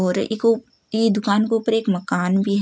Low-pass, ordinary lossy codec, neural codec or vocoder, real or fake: none; none; none; real